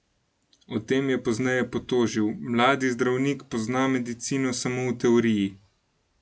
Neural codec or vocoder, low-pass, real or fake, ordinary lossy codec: none; none; real; none